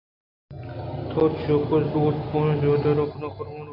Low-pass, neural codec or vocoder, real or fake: 5.4 kHz; none; real